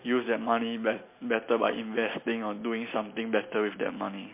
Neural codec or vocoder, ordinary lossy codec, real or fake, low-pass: none; MP3, 24 kbps; real; 3.6 kHz